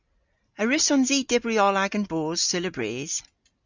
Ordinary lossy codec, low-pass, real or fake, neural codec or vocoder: Opus, 64 kbps; 7.2 kHz; real; none